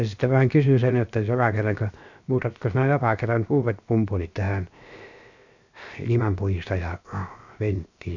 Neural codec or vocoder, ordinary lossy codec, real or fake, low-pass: codec, 16 kHz, 0.7 kbps, FocalCodec; none; fake; 7.2 kHz